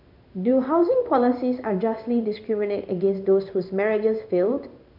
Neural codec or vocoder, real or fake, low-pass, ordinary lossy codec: codec, 16 kHz in and 24 kHz out, 1 kbps, XY-Tokenizer; fake; 5.4 kHz; none